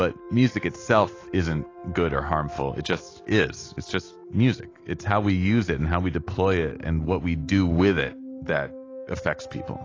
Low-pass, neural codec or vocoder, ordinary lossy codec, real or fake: 7.2 kHz; none; AAC, 32 kbps; real